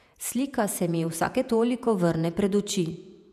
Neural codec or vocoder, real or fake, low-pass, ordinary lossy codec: vocoder, 48 kHz, 128 mel bands, Vocos; fake; 14.4 kHz; none